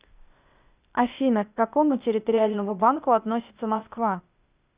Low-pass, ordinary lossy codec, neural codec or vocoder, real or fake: 3.6 kHz; Opus, 64 kbps; codec, 16 kHz, 0.8 kbps, ZipCodec; fake